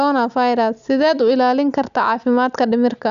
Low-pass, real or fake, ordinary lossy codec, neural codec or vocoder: 7.2 kHz; real; none; none